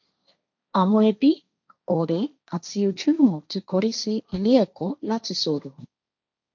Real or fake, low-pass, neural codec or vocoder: fake; 7.2 kHz; codec, 16 kHz, 1.1 kbps, Voila-Tokenizer